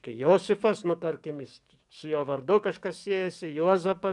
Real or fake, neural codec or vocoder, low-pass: fake; codec, 44.1 kHz, 7.8 kbps, DAC; 10.8 kHz